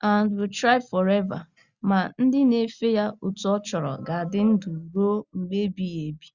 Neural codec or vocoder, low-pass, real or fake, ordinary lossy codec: none; 7.2 kHz; real; Opus, 64 kbps